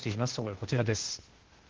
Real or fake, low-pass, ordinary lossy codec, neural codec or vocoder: fake; 7.2 kHz; Opus, 16 kbps; codec, 16 kHz, 0.8 kbps, ZipCodec